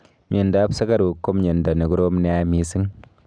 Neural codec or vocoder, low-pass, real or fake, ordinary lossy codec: none; 9.9 kHz; real; none